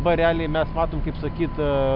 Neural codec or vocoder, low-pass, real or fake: none; 5.4 kHz; real